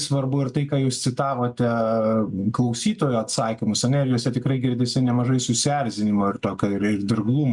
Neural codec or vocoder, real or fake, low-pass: none; real; 10.8 kHz